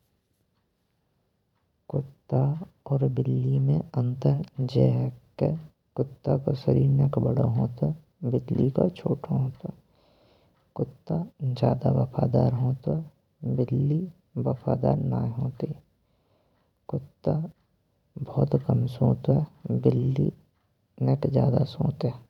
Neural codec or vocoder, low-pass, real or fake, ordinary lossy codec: vocoder, 44.1 kHz, 128 mel bands every 512 samples, BigVGAN v2; 19.8 kHz; fake; none